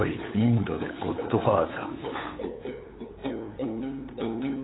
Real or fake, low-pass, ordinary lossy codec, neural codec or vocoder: fake; 7.2 kHz; AAC, 16 kbps; codec, 16 kHz, 8 kbps, FunCodec, trained on LibriTTS, 25 frames a second